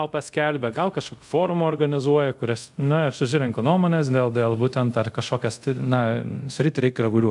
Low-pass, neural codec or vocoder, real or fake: 10.8 kHz; codec, 24 kHz, 0.5 kbps, DualCodec; fake